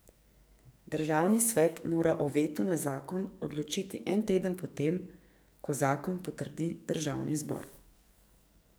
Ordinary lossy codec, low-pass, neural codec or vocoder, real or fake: none; none; codec, 44.1 kHz, 2.6 kbps, SNAC; fake